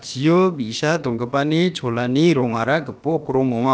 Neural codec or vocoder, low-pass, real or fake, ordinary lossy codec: codec, 16 kHz, about 1 kbps, DyCAST, with the encoder's durations; none; fake; none